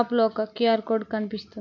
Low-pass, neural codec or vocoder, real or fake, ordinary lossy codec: 7.2 kHz; none; real; none